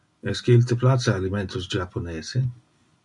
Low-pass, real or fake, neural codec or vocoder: 10.8 kHz; real; none